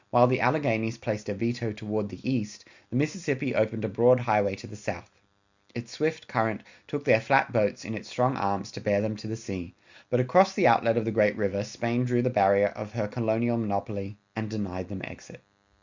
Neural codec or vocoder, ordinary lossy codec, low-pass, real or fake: none; Opus, 64 kbps; 7.2 kHz; real